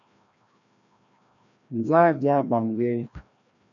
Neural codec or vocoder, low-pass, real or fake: codec, 16 kHz, 1 kbps, FreqCodec, larger model; 7.2 kHz; fake